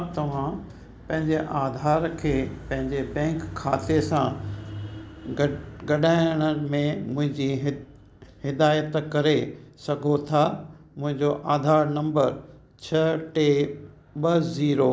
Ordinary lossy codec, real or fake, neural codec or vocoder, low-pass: none; real; none; none